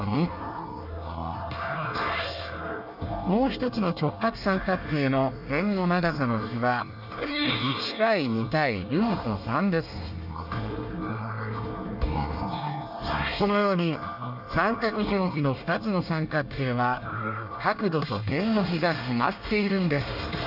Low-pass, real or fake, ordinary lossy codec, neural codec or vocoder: 5.4 kHz; fake; none; codec, 24 kHz, 1 kbps, SNAC